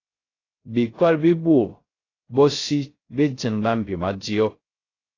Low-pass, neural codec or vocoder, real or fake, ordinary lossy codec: 7.2 kHz; codec, 16 kHz, 0.3 kbps, FocalCodec; fake; AAC, 32 kbps